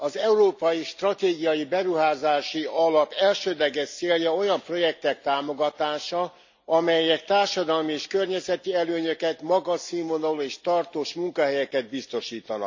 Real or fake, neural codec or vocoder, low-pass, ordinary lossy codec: real; none; 7.2 kHz; MP3, 64 kbps